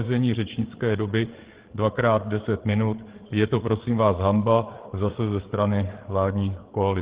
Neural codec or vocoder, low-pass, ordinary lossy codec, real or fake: codec, 16 kHz, 8 kbps, FreqCodec, larger model; 3.6 kHz; Opus, 16 kbps; fake